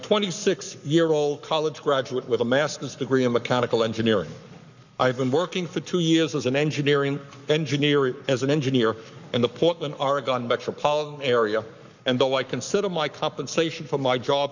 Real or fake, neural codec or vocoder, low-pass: fake; codec, 44.1 kHz, 7.8 kbps, Pupu-Codec; 7.2 kHz